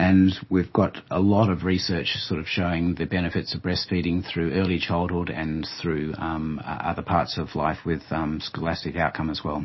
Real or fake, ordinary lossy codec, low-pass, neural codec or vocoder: real; MP3, 24 kbps; 7.2 kHz; none